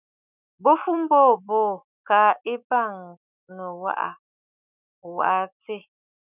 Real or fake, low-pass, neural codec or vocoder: fake; 3.6 kHz; autoencoder, 48 kHz, 128 numbers a frame, DAC-VAE, trained on Japanese speech